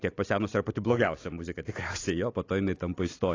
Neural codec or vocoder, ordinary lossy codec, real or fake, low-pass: none; AAC, 32 kbps; real; 7.2 kHz